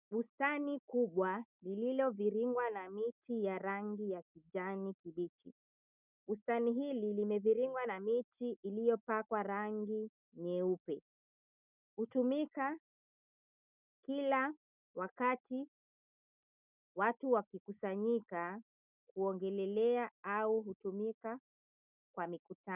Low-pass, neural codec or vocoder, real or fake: 3.6 kHz; none; real